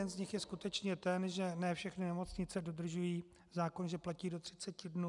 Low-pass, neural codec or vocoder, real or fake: 10.8 kHz; none; real